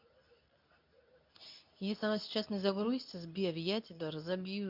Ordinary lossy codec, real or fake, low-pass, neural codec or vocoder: none; fake; 5.4 kHz; codec, 24 kHz, 0.9 kbps, WavTokenizer, medium speech release version 2